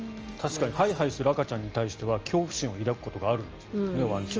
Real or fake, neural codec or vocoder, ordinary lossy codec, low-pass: real; none; Opus, 24 kbps; 7.2 kHz